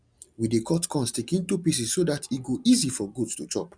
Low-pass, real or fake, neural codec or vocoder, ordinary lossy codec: 9.9 kHz; real; none; none